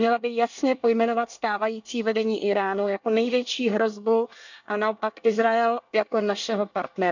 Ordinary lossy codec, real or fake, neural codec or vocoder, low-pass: none; fake; codec, 24 kHz, 1 kbps, SNAC; 7.2 kHz